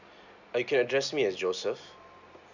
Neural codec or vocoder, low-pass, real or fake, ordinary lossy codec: none; 7.2 kHz; real; none